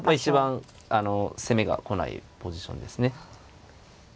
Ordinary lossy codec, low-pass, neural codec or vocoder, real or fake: none; none; none; real